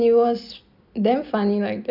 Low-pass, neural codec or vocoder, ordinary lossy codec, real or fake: 5.4 kHz; none; none; real